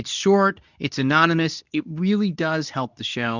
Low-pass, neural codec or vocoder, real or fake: 7.2 kHz; codec, 24 kHz, 0.9 kbps, WavTokenizer, medium speech release version 2; fake